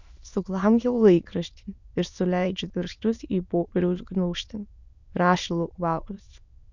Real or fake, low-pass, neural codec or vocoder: fake; 7.2 kHz; autoencoder, 22.05 kHz, a latent of 192 numbers a frame, VITS, trained on many speakers